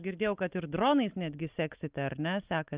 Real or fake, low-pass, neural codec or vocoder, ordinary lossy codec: real; 3.6 kHz; none; Opus, 24 kbps